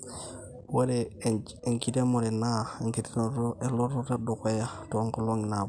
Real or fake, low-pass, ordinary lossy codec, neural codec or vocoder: real; 9.9 kHz; none; none